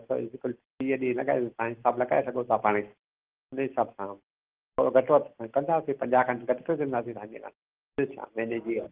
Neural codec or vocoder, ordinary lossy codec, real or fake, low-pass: none; Opus, 16 kbps; real; 3.6 kHz